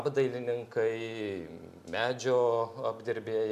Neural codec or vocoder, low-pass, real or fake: none; 14.4 kHz; real